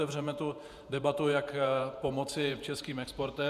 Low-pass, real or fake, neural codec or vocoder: 14.4 kHz; fake; vocoder, 48 kHz, 128 mel bands, Vocos